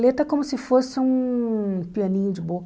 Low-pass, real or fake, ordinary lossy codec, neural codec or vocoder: none; real; none; none